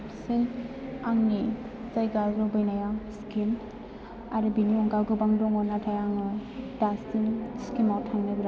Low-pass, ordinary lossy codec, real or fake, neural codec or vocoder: none; none; real; none